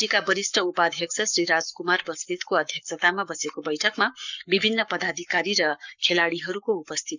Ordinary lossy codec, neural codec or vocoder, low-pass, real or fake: none; codec, 44.1 kHz, 7.8 kbps, Pupu-Codec; 7.2 kHz; fake